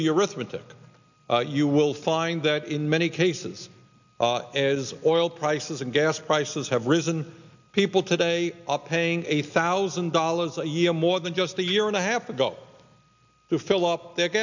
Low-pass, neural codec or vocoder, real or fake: 7.2 kHz; none; real